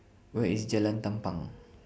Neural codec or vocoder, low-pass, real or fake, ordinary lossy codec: none; none; real; none